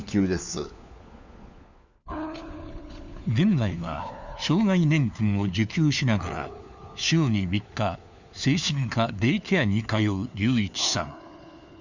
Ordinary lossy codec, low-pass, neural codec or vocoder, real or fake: none; 7.2 kHz; codec, 16 kHz, 4 kbps, FunCodec, trained on LibriTTS, 50 frames a second; fake